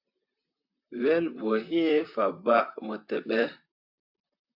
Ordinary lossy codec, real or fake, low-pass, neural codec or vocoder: AAC, 48 kbps; fake; 5.4 kHz; vocoder, 44.1 kHz, 128 mel bands, Pupu-Vocoder